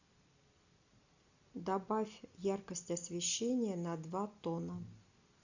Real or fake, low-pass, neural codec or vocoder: real; 7.2 kHz; none